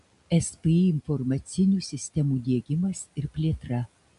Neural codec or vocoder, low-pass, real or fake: none; 10.8 kHz; real